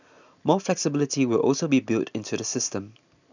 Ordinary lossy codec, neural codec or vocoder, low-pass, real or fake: none; none; 7.2 kHz; real